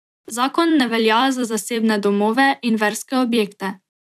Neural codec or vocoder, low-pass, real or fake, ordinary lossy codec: vocoder, 44.1 kHz, 128 mel bands every 256 samples, BigVGAN v2; 14.4 kHz; fake; none